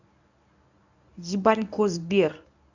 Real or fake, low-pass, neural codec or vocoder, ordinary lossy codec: fake; 7.2 kHz; codec, 24 kHz, 0.9 kbps, WavTokenizer, medium speech release version 1; none